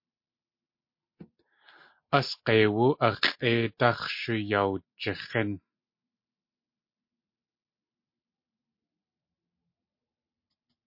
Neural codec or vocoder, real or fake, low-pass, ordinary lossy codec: none; real; 5.4 kHz; MP3, 32 kbps